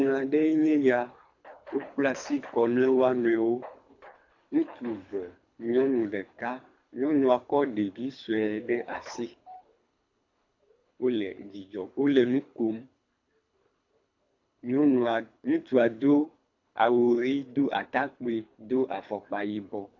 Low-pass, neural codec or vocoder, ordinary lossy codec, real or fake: 7.2 kHz; codec, 24 kHz, 3 kbps, HILCodec; AAC, 48 kbps; fake